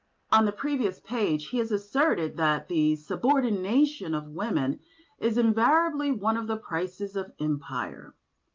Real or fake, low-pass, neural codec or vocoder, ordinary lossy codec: real; 7.2 kHz; none; Opus, 32 kbps